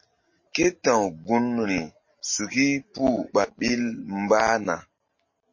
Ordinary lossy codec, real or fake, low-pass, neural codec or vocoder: MP3, 32 kbps; real; 7.2 kHz; none